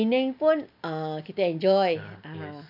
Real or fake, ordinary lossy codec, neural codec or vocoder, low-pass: real; none; none; 5.4 kHz